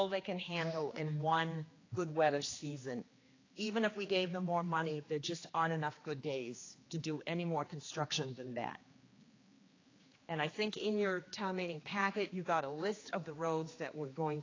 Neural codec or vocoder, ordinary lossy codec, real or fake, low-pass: codec, 16 kHz, 2 kbps, X-Codec, HuBERT features, trained on general audio; AAC, 32 kbps; fake; 7.2 kHz